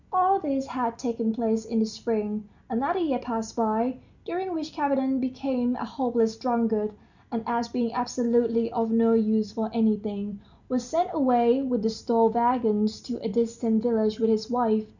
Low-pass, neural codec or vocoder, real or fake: 7.2 kHz; none; real